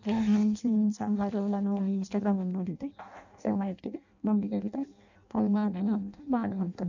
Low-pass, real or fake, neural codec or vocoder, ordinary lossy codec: 7.2 kHz; fake; codec, 16 kHz in and 24 kHz out, 0.6 kbps, FireRedTTS-2 codec; none